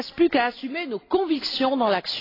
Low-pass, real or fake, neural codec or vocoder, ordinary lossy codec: 5.4 kHz; real; none; AAC, 24 kbps